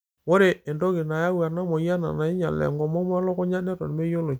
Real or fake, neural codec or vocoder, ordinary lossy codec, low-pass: real; none; none; none